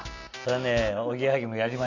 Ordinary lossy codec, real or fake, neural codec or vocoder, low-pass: none; real; none; 7.2 kHz